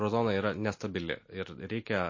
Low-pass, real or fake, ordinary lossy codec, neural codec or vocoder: 7.2 kHz; real; MP3, 32 kbps; none